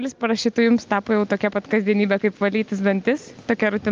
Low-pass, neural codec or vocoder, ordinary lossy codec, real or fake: 7.2 kHz; none; Opus, 24 kbps; real